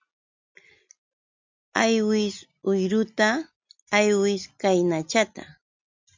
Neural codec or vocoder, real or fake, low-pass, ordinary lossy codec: none; real; 7.2 kHz; MP3, 64 kbps